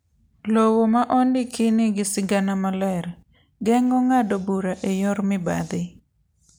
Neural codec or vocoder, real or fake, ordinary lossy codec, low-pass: none; real; none; none